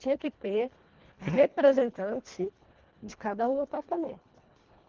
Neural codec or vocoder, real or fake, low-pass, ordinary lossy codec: codec, 24 kHz, 1.5 kbps, HILCodec; fake; 7.2 kHz; Opus, 16 kbps